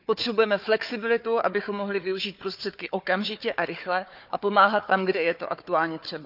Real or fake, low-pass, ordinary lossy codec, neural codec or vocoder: fake; 5.4 kHz; none; codec, 16 kHz, 4 kbps, FunCodec, trained on Chinese and English, 50 frames a second